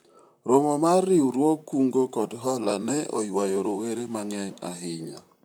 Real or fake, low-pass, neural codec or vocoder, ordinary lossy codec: fake; none; vocoder, 44.1 kHz, 128 mel bands, Pupu-Vocoder; none